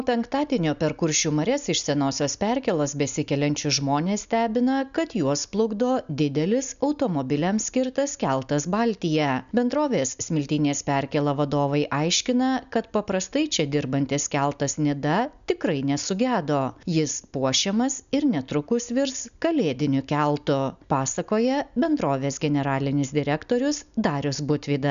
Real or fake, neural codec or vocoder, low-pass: real; none; 7.2 kHz